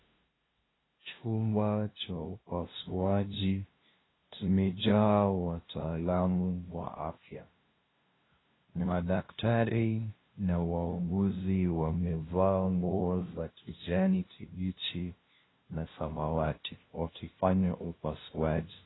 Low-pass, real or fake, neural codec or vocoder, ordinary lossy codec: 7.2 kHz; fake; codec, 16 kHz, 0.5 kbps, FunCodec, trained on LibriTTS, 25 frames a second; AAC, 16 kbps